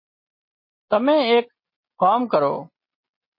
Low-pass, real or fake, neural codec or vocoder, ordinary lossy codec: 5.4 kHz; real; none; MP3, 24 kbps